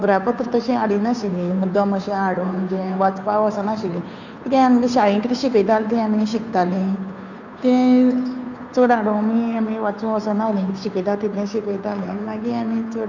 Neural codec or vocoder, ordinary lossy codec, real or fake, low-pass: codec, 16 kHz, 2 kbps, FunCodec, trained on Chinese and English, 25 frames a second; none; fake; 7.2 kHz